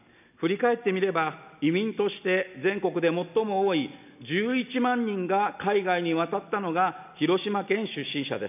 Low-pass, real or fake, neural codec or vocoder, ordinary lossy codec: 3.6 kHz; real; none; none